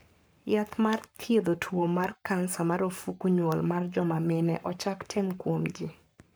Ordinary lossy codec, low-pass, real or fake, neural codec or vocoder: none; none; fake; codec, 44.1 kHz, 7.8 kbps, Pupu-Codec